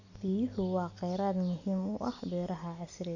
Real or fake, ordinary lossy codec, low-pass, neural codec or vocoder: real; none; 7.2 kHz; none